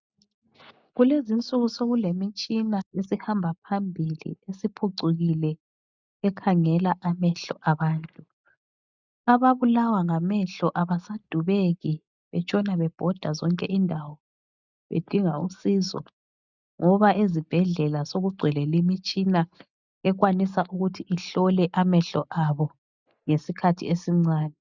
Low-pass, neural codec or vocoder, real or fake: 7.2 kHz; none; real